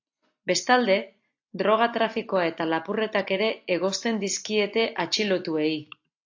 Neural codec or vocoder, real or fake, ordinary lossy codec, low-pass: none; real; AAC, 48 kbps; 7.2 kHz